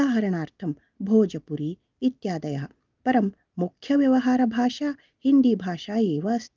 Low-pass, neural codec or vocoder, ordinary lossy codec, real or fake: 7.2 kHz; none; Opus, 32 kbps; real